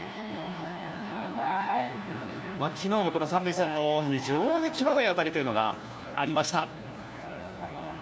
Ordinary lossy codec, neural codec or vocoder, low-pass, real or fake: none; codec, 16 kHz, 1 kbps, FunCodec, trained on LibriTTS, 50 frames a second; none; fake